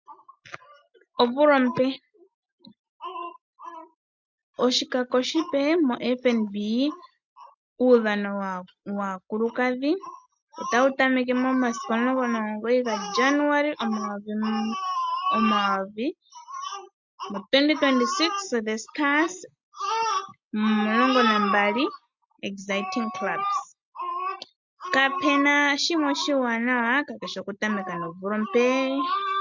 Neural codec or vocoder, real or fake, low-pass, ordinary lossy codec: none; real; 7.2 kHz; MP3, 64 kbps